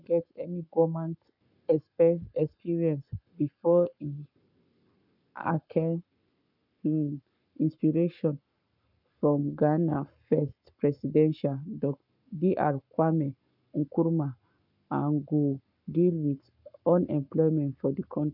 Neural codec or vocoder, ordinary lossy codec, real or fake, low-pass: codec, 44.1 kHz, 7.8 kbps, Pupu-Codec; none; fake; 5.4 kHz